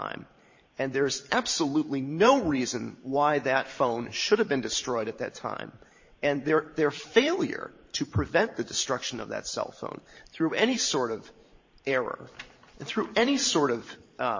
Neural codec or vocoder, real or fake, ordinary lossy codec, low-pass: vocoder, 44.1 kHz, 128 mel bands every 512 samples, BigVGAN v2; fake; MP3, 32 kbps; 7.2 kHz